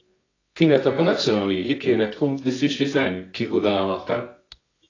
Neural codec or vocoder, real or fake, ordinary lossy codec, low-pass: codec, 24 kHz, 0.9 kbps, WavTokenizer, medium music audio release; fake; AAC, 32 kbps; 7.2 kHz